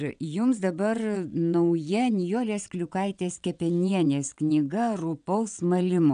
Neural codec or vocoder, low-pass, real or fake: vocoder, 22.05 kHz, 80 mel bands, WaveNeXt; 9.9 kHz; fake